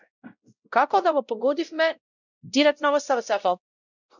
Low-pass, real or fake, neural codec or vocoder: 7.2 kHz; fake; codec, 16 kHz, 0.5 kbps, X-Codec, WavLM features, trained on Multilingual LibriSpeech